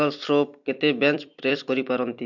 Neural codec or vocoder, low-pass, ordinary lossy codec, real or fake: vocoder, 44.1 kHz, 128 mel bands every 256 samples, BigVGAN v2; 7.2 kHz; MP3, 64 kbps; fake